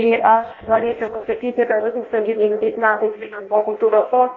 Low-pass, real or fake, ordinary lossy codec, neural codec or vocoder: 7.2 kHz; fake; AAC, 32 kbps; codec, 16 kHz in and 24 kHz out, 0.6 kbps, FireRedTTS-2 codec